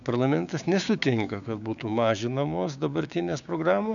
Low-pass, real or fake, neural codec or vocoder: 7.2 kHz; fake; codec, 16 kHz, 6 kbps, DAC